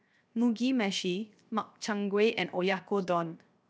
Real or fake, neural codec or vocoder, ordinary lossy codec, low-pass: fake; codec, 16 kHz, 0.7 kbps, FocalCodec; none; none